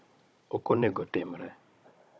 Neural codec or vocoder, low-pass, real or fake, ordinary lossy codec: codec, 16 kHz, 16 kbps, FunCodec, trained on Chinese and English, 50 frames a second; none; fake; none